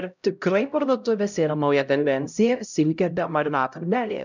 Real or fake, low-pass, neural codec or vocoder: fake; 7.2 kHz; codec, 16 kHz, 0.5 kbps, X-Codec, HuBERT features, trained on LibriSpeech